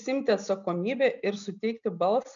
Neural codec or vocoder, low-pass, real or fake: none; 7.2 kHz; real